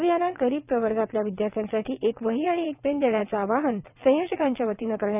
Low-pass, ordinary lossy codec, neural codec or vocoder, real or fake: 3.6 kHz; none; vocoder, 22.05 kHz, 80 mel bands, WaveNeXt; fake